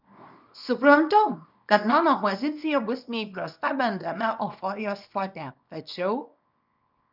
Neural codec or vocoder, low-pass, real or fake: codec, 24 kHz, 0.9 kbps, WavTokenizer, small release; 5.4 kHz; fake